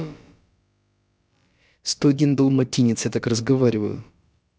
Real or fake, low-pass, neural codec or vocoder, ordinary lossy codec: fake; none; codec, 16 kHz, about 1 kbps, DyCAST, with the encoder's durations; none